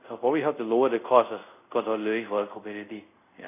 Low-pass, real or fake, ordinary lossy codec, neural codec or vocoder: 3.6 kHz; fake; none; codec, 24 kHz, 0.5 kbps, DualCodec